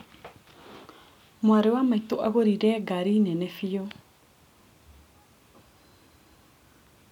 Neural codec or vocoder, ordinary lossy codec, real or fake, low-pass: none; none; real; 19.8 kHz